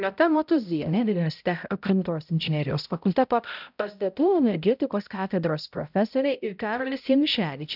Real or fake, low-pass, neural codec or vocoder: fake; 5.4 kHz; codec, 16 kHz, 0.5 kbps, X-Codec, HuBERT features, trained on balanced general audio